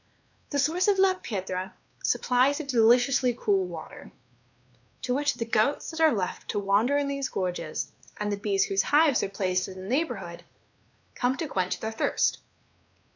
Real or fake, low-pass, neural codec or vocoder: fake; 7.2 kHz; codec, 16 kHz, 2 kbps, X-Codec, WavLM features, trained on Multilingual LibriSpeech